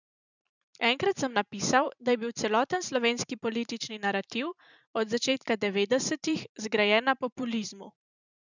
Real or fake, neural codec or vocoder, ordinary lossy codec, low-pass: fake; vocoder, 44.1 kHz, 128 mel bands every 512 samples, BigVGAN v2; none; 7.2 kHz